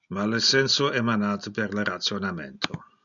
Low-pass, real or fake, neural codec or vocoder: 7.2 kHz; real; none